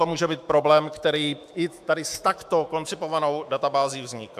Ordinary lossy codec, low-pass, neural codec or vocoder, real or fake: AAC, 96 kbps; 14.4 kHz; autoencoder, 48 kHz, 128 numbers a frame, DAC-VAE, trained on Japanese speech; fake